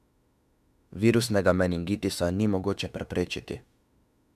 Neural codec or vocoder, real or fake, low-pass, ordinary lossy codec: autoencoder, 48 kHz, 32 numbers a frame, DAC-VAE, trained on Japanese speech; fake; 14.4 kHz; none